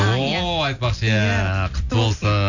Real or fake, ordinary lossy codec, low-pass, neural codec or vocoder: real; none; 7.2 kHz; none